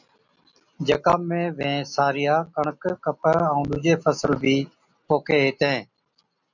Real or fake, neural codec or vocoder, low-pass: real; none; 7.2 kHz